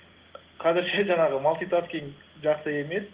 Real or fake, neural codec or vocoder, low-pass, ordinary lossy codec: real; none; 3.6 kHz; Opus, 16 kbps